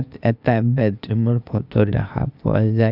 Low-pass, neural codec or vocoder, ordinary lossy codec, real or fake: 5.4 kHz; codec, 16 kHz, 0.8 kbps, ZipCodec; Opus, 64 kbps; fake